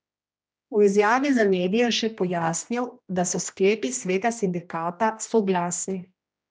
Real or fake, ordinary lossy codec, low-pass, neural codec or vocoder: fake; none; none; codec, 16 kHz, 1 kbps, X-Codec, HuBERT features, trained on general audio